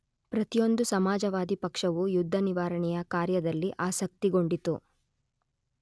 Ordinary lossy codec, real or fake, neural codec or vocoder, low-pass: none; real; none; none